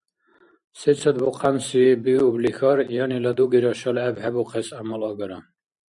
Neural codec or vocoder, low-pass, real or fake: vocoder, 44.1 kHz, 128 mel bands every 512 samples, BigVGAN v2; 10.8 kHz; fake